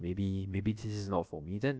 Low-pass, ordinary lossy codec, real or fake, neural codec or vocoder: none; none; fake; codec, 16 kHz, about 1 kbps, DyCAST, with the encoder's durations